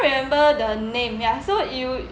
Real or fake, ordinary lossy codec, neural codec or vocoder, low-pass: real; none; none; none